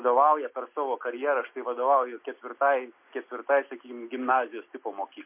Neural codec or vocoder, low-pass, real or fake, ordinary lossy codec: none; 3.6 kHz; real; MP3, 24 kbps